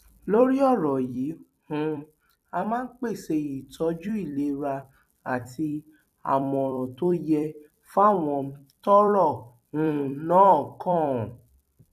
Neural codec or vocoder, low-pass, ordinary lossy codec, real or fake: vocoder, 44.1 kHz, 128 mel bands every 256 samples, BigVGAN v2; 14.4 kHz; MP3, 96 kbps; fake